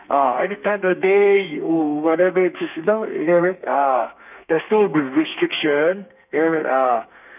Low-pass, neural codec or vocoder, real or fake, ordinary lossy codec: 3.6 kHz; codec, 32 kHz, 1.9 kbps, SNAC; fake; none